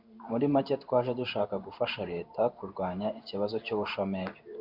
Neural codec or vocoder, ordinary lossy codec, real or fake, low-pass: codec, 16 kHz in and 24 kHz out, 1 kbps, XY-Tokenizer; Opus, 64 kbps; fake; 5.4 kHz